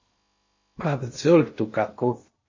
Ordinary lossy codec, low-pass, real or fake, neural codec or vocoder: MP3, 32 kbps; 7.2 kHz; fake; codec, 16 kHz in and 24 kHz out, 0.6 kbps, FocalCodec, streaming, 2048 codes